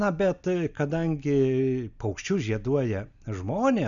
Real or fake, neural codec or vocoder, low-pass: real; none; 7.2 kHz